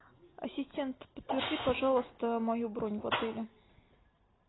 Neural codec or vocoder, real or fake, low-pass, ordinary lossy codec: none; real; 7.2 kHz; AAC, 16 kbps